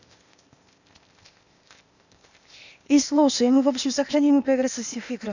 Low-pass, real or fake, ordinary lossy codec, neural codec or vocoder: 7.2 kHz; fake; none; codec, 16 kHz, 0.8 kbps, ZipCodec